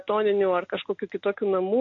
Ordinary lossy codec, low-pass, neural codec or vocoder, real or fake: AAC, 48 kbps; 7.2 kHz; none; real